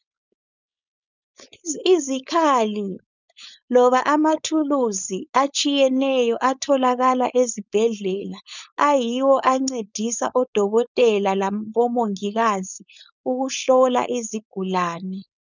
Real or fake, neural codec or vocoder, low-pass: fake; codec, 16 kHz, 4.8 kbps, FACodec; 7.2 kHz